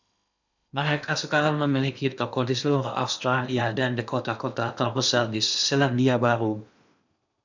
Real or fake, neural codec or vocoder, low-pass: fake; codec, 16 kHz in and 24 kHz out, 0.8 kbps, FocalCodec, streaming, 65536 codes; 7.2 kHz